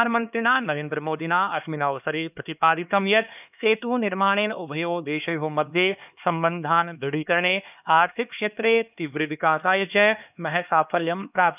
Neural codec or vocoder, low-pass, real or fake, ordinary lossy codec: codec, 16 kHz, 2 kbps, X-Codec, HuBERT features, trained on LibriSpeech; 3.6 kHz; fake; none